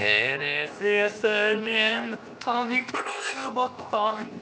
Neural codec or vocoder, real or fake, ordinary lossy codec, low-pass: codec, 16 kHz, 0.7 kbps, FocalCodec; fake; none; none